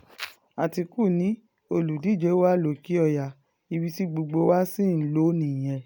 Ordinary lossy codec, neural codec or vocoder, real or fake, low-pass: none; none; real; none